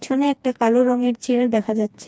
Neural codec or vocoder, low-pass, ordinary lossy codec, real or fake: codec, 16 kHz, 2 kbps, FreqCodec, smaller model; none; none; fake